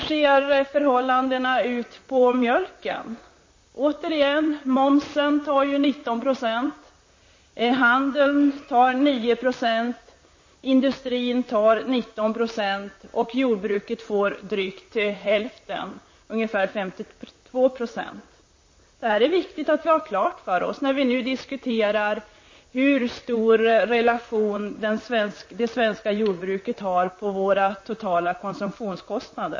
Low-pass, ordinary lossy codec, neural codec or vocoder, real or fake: 7.2 kHz; MP3, 32 kbps; vocoder, 44.1 kHz, 128 mel bands, Pupu-Vocoder; fake